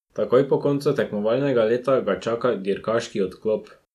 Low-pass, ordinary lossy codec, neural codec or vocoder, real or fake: 14.4 kHz; none; none; real